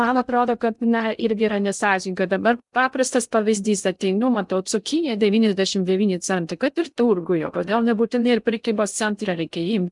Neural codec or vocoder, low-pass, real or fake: codec, 16 kHz in and 24 kHz out, 0.6 kbps, FocalCodec, streaming, 2048 codes; 10.8 kHz; fake